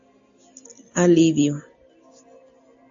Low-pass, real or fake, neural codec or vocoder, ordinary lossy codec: 7.2 kHz; real; none; AAC, 48 kbps